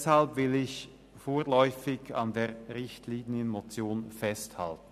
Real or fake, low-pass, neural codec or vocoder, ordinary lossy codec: real; 14.4 kHz; none; none